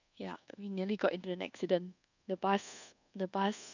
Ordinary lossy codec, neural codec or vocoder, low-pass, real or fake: none; codec, 24 kHz, 1.2 kbps, DualCodec; 7.2 kHz; fake